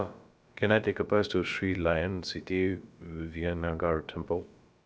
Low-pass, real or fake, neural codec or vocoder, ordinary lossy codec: none; fake; codec, 16 kHz, about 1 kbps, DyCAST, with the encoder's durations; none